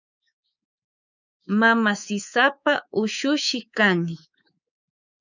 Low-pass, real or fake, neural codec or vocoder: 7.2 kHz; fake; codec, 24 kHz, 3.1 kbps, DualCodec